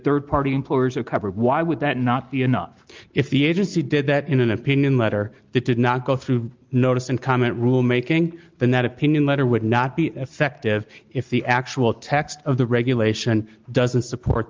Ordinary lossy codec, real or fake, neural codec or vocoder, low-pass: Opus, 32 kbps; real; none; 7.2 kHz